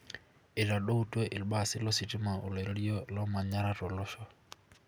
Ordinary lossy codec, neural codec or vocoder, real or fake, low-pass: none; none; real; none